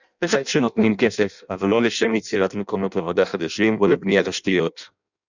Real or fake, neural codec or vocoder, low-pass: fake; codec, 16 kHz in and 24 kHz out, 0.6 kbps, FireRedTTS-2 codec; 7.2 kHz